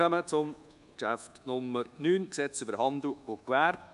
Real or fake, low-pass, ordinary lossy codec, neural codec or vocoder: fake; 10.8 kHz; none; codec, 24 kHz, 1.2 kbps, DualCodec